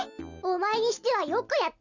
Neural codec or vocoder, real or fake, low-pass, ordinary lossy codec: none; real; 7.2 kHz; none